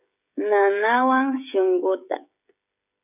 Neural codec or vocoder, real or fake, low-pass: codec, 16 kHz, 16 kbps, FreqCodec, smaller model; fake; 3.6 kHz